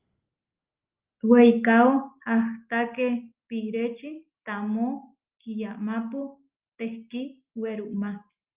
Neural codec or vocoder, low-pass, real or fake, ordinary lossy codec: none; 3.6 kHz; real; Opus, 32 kbps